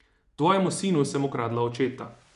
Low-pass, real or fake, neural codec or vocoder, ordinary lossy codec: 10.8 kHz; fake; vocoder, 24 kHz, 100 mel bands, Vocos; none